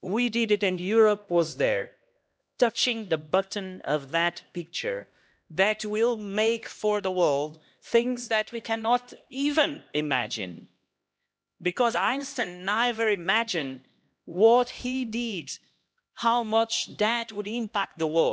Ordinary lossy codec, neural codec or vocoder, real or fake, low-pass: none; codec, 16 kHz, 1 kbps, X-Codec, HuBERT features, trained on LibriSpeech; fake; none